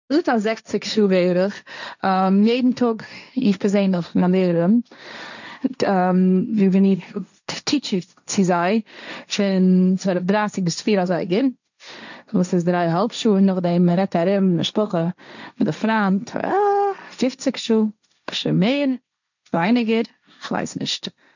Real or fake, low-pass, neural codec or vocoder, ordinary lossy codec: fake; 7.2 kHz; codec, 16 kHz, 1.1 kbps, Voila-Tokenizer; none